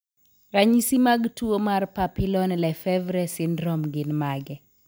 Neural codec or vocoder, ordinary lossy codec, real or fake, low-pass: none; none; real; none